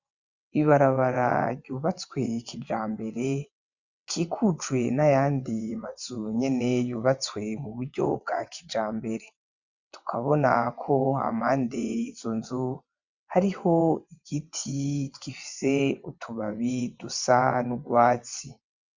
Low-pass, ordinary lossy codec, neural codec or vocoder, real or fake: 7.2 kHz; Opus, 64 kbps; vocoder, 22.05 kHz, 80 mel bands, WaveNeXt; fake